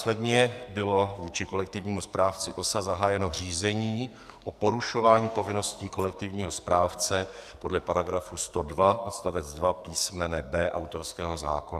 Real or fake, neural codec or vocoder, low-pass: fake; codec, 44.1 kHz, 2.6 kbps, SNAC; 14.4 kHz